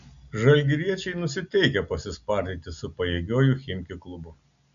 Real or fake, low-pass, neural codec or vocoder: real; 7.2 kHz; none